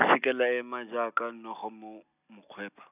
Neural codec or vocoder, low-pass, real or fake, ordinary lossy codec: none; 3.6 kHz; real; none